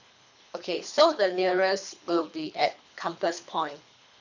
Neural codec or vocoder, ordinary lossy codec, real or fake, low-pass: codec, 24 kHz, 3 kbps, HILCodec; none; fake; 7.2 kHz